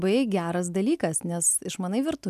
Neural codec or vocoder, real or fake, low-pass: none; real; 14.4 kHz